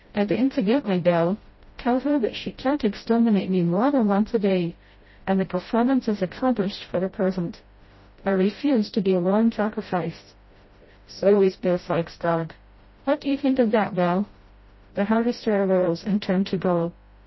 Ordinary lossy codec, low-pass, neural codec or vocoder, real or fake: MP3, 24 kbps; 7.2 kHz; codec, 16 kHz, 0.5 kbps, FreqCodec, smaller model; fake